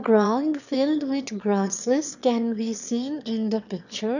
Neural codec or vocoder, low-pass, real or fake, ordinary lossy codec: autoencoder, 22.05 kHz, a latent of 192 numbers a frame, VITS, trained on one speaker; 7.2 kHz; fake; none